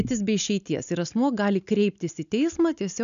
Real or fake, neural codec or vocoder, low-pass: real; none; 7.2 kHz